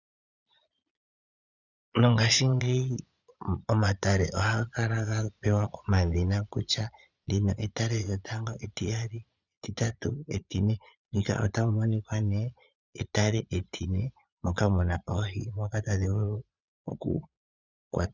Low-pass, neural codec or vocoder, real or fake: 7.2 kHz; vocoder, 22.05 kHz, 80 mel bands, Vocos; fake